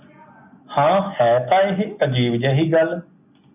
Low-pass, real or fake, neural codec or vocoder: 3.6 kHz; real; none